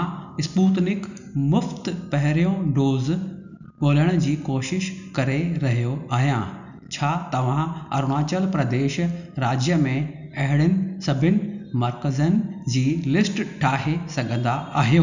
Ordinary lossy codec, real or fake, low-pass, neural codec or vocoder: none; real; 7.2 kHz; none